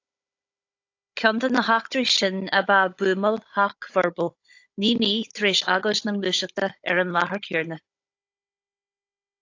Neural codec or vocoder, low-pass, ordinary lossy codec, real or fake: codec, 16 kHz, 16 kbps, FunCodec, trained on Chinese and English, 50 frames a second; 7.2 kHz; AAC, 48 kbps; fake